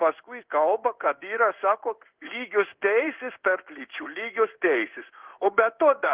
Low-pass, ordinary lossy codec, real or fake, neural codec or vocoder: 3.6 kHz; Opus, 16 kbps; fake; codec, 16 kHz in and 24 kHz out, 1 kbps, XY-Tokenizer